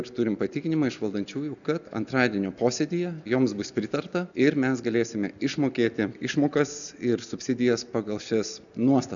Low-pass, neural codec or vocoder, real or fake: 7.2 kHz; none; real